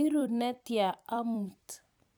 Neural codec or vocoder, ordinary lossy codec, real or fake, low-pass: vocoder, 44.1 kHz, 128 mel bands every 256 samples, BigVGAN v2; none; fake; none